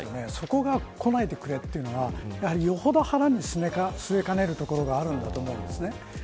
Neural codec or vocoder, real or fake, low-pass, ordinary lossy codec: none; real; none; none